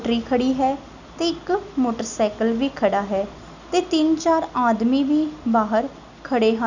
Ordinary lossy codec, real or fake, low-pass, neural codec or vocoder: none; real; 7.2 kHz; none